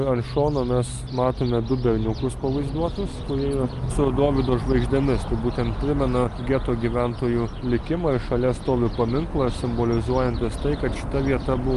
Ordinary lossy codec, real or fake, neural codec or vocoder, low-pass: Opus, 32 kbps; real; none; 10.8 kHz